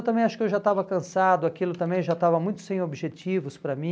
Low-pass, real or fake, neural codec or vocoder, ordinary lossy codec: none; real; none; none